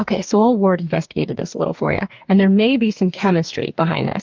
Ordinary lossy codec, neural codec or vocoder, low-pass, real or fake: Opus, 24 kbps; codec, 44.1 kHz, 2.6 kbps, DAC; 7.2 kHz; fake